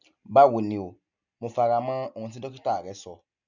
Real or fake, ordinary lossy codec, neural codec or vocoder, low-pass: real; none; none; 7.2 kHz